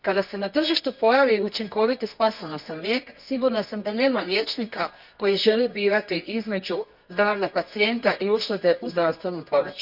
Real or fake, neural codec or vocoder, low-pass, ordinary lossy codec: fake; codec, 24 kHz, 0.9 kbps, WavTokenizer, medium music audio release; 5.4 kHz; none